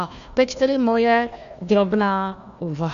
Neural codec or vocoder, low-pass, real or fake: codec, 16 kHz, 1 kbps, FunCodec, trained on Chinese and English, 50 frames a second; 7.2 kHz; fake